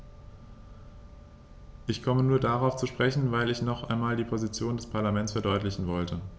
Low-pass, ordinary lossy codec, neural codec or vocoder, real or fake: none; none; none; real